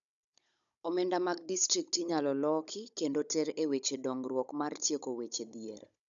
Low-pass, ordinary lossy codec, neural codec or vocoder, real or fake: 7.2 kHz; none; codec, 16 kHz, 16 kbps, FunCodec, trained on Chinese and English, 50 frames a second; fake